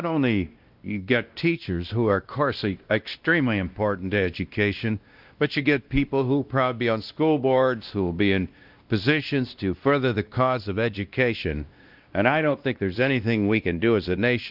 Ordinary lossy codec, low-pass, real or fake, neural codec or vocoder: Opus, 24 kbps; 5.4 kHz; fake; codec, 16 kHz, 1 kbps, X-Codec, WavLM features, trained on Multilingual LibriSpeech